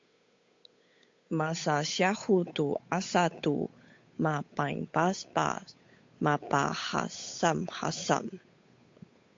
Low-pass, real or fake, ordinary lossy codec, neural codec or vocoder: 7.2 kHz; fake; AAC, 48 kbps; codec, 16 kHz, 8 kbps, FunCodec, trained on Chinese and English, 25 frames a second